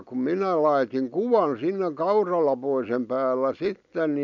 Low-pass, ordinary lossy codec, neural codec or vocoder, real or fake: 7.2 kHz; none; none; real